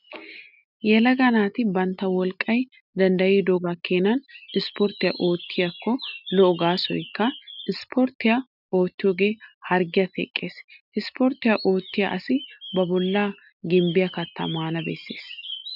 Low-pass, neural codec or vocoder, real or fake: 5.4 kHz; none; real